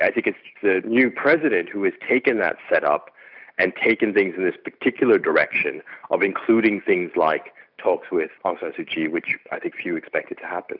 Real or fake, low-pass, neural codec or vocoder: real; 5.4 kHz; none